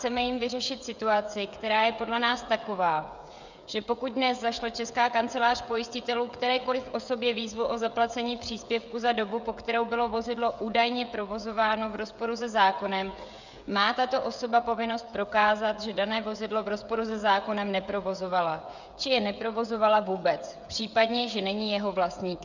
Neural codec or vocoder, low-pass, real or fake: codec, 16 kHz, 16 kbps, FreqCodec, smaller model; 7.2 kHz; fake